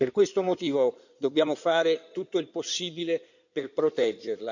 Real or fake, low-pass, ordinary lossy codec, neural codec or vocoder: fake; 7.2 kHz; none; codec, 16 kHz in and 24 kHz out, 2.2 kbps, FireRedTTS-2 codec